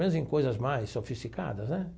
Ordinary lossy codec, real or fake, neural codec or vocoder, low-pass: none; real; none; none